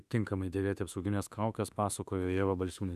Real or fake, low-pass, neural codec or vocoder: fake; 14.4 kHz; autoencoder, 48 kHz, 32 numbers a frame, DAC-VAE, trained on Japanese speech